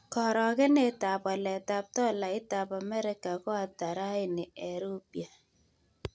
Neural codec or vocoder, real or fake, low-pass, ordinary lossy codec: none; real; none; none